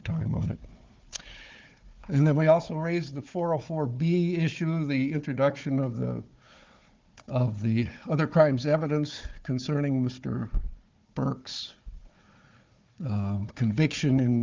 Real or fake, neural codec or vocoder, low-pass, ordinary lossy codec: fake; codec, 16 kHz, 4 kbps, FunCodec, trained on Chinese and English, 50 frames a second; 7.2 kHz; Opus, 32 kbps